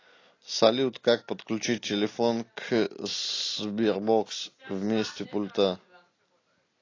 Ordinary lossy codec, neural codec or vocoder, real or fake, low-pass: AAC, 32 kbps; none; real; 7.2 kHz